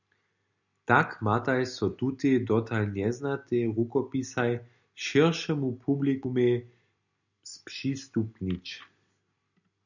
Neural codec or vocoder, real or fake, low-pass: none; real; 7.2 kHz